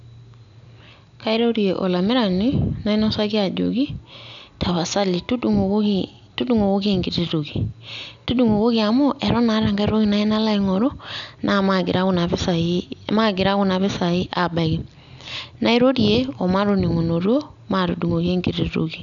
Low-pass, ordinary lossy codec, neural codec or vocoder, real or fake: 7.2 kHz; none; none; real